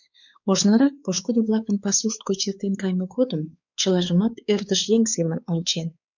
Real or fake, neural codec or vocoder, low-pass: fake; codec, 16 kHz, 4 kbps, X-Codec, WavLM features, trained on Multilingual LibriSpeech; 7.2 kHz